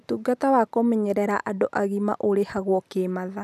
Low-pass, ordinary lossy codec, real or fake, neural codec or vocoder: 14.4 kHz; none; real; none